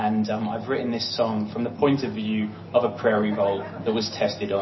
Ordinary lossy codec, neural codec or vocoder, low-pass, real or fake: MP3, 24 kbps; none; 7.2 kHz; real